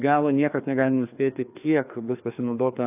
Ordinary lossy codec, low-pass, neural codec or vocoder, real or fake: AAC, 32 kbps; 3.6 kHz; codec, 16 kHz, 2 kbps, FreqCodec, larger model; fake